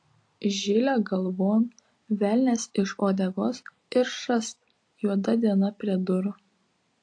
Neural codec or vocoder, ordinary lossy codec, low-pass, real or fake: none; AAC, 48 kbps; 9.9 kHz; real